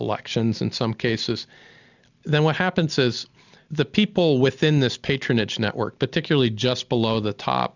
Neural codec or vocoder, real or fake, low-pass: none; real; 7.2 kHz